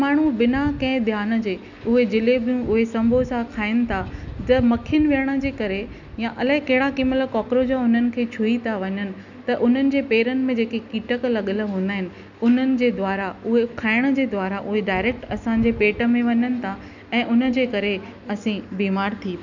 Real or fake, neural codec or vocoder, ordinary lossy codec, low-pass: real; none; none; 7.2 kHz